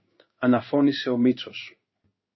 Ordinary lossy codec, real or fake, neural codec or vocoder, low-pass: MP3, 24 kbps; fake; codec, 16 kHz in and 24 kHz out, 1 kbps, XY-Tokenizer; 7.2 kHz